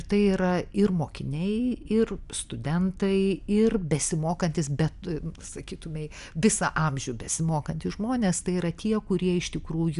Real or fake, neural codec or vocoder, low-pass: real; none; 10.8 kHz